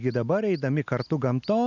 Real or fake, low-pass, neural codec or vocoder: real; 7.2 kHz; none